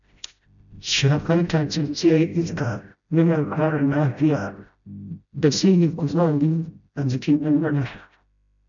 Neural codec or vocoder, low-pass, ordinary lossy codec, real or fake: codec, 16 kHz, 0.5 kbps, FreqCodec, smaller model; 7.2 kHz; none; fake